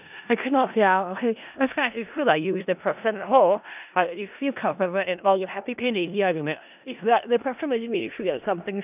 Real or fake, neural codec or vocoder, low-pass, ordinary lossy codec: fake; codec, 16 kHz in and 24 kHz out, 0.4 kbps, LongCat-Audio-Codec, four codebook decoder; 3.6 kHz; none